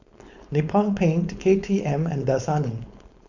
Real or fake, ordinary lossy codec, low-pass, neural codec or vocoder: fake; none; 7.2 kHz; codec, 16 kHz, 4.8 kbps, FACodec